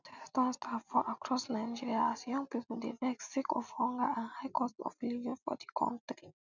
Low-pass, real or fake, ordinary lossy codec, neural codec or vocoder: 7.2 kHz; real; none; none